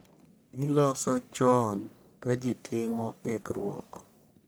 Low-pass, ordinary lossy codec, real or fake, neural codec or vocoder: none; none; fake; codec, 44.1 kHz, 1.7 kbps, Pupu-Codec